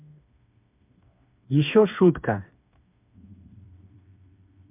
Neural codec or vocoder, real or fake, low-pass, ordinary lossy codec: codec, 16 kHz, 4 kbps, FreqCodec, smaller model; fake; 3.6 kHz; MP3, 32 kbps